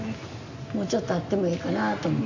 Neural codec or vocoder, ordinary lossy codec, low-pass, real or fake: none; AAC, 48 kbps; 7.2 kHz; real